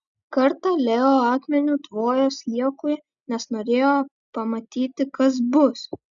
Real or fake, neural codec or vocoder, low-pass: real; none; 7.2 kHz